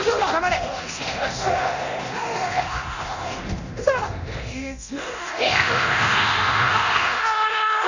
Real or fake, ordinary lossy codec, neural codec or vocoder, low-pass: fake; none; codec, 24 kHz, 0.9 kbps, DualCodec; 7.2 kHz